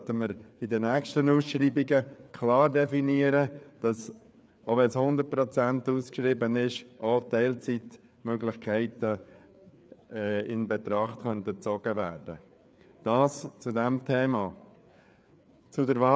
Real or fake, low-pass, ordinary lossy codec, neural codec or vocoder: fake; none; none; codec, 16 kHz, 4 kbps, FreqCodec, larger model